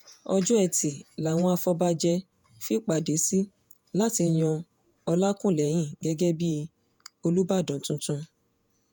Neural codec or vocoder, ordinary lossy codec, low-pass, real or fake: vocoder, 48 kHz, 128 mel bands, Vocos; none; none; fake